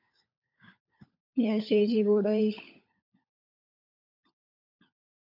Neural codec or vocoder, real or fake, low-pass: codec, 16 kHz, 16 kbps, FunCodec, trained on LibriTTS, 50 frames a second; fake; 5.4 kHz